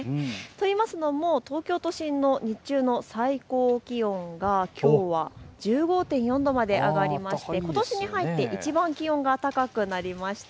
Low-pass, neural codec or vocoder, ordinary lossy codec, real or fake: none; none; none; real